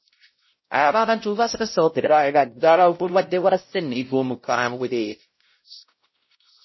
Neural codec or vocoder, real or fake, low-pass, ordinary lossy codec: codec, 16 kHz, 0.5 kbps, X-Codec, WavLM features, trained on Multilingual LibriSpeech; fake; 7.2 kHz; MP3, 24 kbps